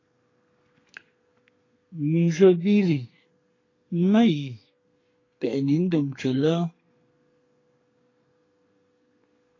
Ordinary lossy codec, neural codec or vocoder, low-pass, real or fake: AAC, 32 kbps; codec, 32 kHz, 1.9 kbps, SNAC; 7.2 kHz; fake